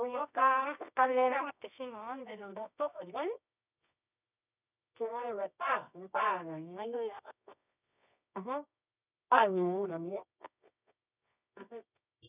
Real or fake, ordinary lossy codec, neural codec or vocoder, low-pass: fake; none; codec, 24 kHz, 0.9 kbps, WavTokenizer, medium music audio release; 3.6 kHz